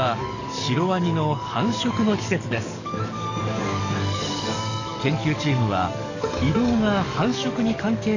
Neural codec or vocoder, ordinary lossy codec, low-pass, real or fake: codec, 44.1 kHz, 7.8 kbps, DAC; none; 7.2 kHz; fake